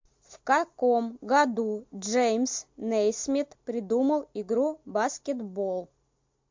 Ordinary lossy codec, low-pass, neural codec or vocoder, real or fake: MP3, 48 kbps; 7.2 kHz; none; real